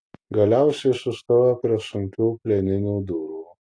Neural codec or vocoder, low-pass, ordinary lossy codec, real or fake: none; 9.9 kHz; AAC, 48 kbps; real